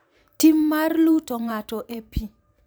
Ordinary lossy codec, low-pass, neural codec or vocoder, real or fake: none; none; vocoder, 44.1 kHz, 128 mel bands every 512 samples, BigVGAN v2; fake